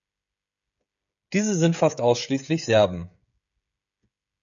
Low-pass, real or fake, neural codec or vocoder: 7.2 kHz; fake; codec, 16 kHz, 16 kbps, FreqCodec, smaller model